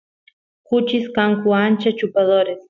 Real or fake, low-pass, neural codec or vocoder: real; 7.2 kHz; none